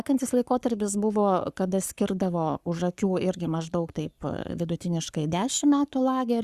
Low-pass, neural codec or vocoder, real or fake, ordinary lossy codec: 14.4 kHz; codec, 44.1 kHz, 7.8 kbps, Pupu-Codec; fake; Opus, 64 kbps